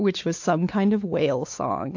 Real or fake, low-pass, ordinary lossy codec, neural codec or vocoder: fake; 7.2 kHz; AAC, 48 kbps; codec, 16 kHz, 4 kbps, X-Codec, WavLM features, trained on Multilingual LibriSpeech